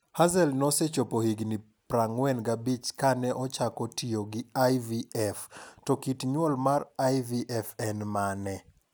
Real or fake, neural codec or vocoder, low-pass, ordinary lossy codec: real; none; none; none